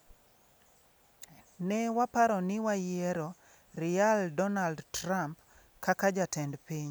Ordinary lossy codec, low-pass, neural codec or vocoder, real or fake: none; none; none; real